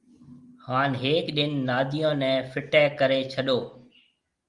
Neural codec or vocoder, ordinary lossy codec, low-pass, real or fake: none; Opus, 32 kbps; 10.8 kHz; real